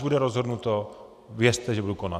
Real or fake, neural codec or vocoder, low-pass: real; none; 14.4 kHz